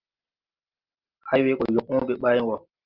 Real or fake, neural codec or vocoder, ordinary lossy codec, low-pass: real; none; Opus, 32 kbps; 5.4 kHz